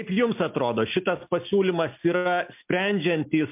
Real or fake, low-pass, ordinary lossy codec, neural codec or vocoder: real; 3.6 kHz; MP3, 32 kbps; none